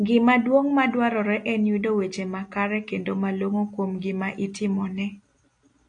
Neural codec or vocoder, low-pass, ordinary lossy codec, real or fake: none; 9.9 kHz; AAC, 48 kbps; real